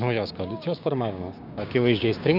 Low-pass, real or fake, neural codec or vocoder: 5.4 kHz; fake; codec, 16 kHz, 6 kbps, DAC